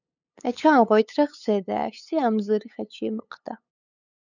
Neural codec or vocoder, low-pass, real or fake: codec, 16 kHz, 8 kbps, FunCodec, trained on LibriTTS, 25 frames a second; 7.2 kHz; fake